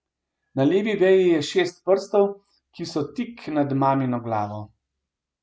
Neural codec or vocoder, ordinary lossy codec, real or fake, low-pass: none; none; real; none